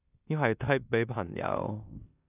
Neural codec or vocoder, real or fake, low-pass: codec, 16 kHz in and 24 kHz out, 0.9 kbps, LongCat-Audio-Codec, four codebook decoder; fake; 3.6 kHz